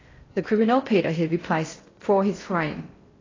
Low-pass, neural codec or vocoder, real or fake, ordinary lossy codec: 7.2 kHz; codec, 16 kHz in and 24 kHz out, 0.6 kbps, FocalCodec, streaming, 4096 codes; fake; AAC, 32 kbps